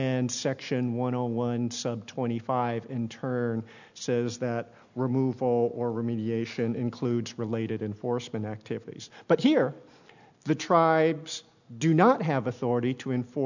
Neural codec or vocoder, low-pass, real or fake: none; 7.2 kHz; real